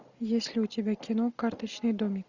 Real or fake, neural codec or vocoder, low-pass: real; none; 7.2 kHz